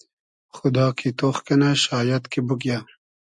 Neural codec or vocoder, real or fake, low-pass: none; real; 10.8 kHz